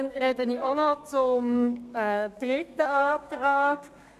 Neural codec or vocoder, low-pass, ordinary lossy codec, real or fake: codec, 32 kHz, 1.9 kbps, SNAC; 14.4 kHz; none; fake